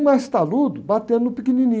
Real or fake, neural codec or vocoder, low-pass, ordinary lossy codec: real; none; none; none